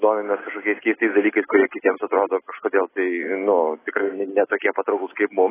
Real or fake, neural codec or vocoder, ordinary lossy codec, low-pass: real; none; AAC, 16 kbps; 3.6 kHz